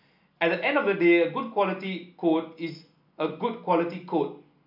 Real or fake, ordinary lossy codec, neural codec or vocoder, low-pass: real; MP3, 48 kbps; none; 5.4 kHz